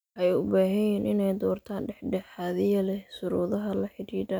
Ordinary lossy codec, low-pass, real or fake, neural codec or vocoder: none; none; real; none